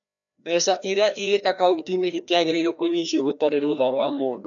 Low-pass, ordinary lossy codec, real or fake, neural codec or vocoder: 7.2 kHz; none; fake; codec, 16 kHz, 1 kbps, FreqCodec, larger model